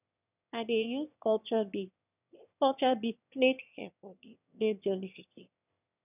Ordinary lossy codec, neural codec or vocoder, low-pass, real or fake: none; autoencoder, 22.05 kHz, a latent of 192 numbers a frame, VITS, trained on one speaker; 3.6 kHz; fake